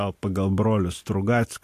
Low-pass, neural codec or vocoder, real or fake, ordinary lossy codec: 14.4 kHz; none; real; AAC, 64 kbps